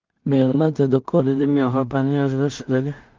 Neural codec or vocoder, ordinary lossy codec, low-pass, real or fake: codec, 16 kHz in and 24 kHz out, 0.4 kbps, LongCat-Audio-Codec, two codebook decoder; Opus, 32 kbps; 7.2 kHz; fake